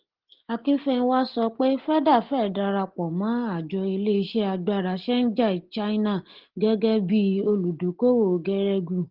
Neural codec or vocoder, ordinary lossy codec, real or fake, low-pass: none; Opus, 16 kbps; real; 5.4 kHz